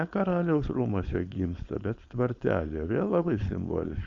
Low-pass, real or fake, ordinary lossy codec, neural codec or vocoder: 7.2 kHz; fake; Opus, 64 kbps; codec, 16 kHz, 4.8 kbps, FACodec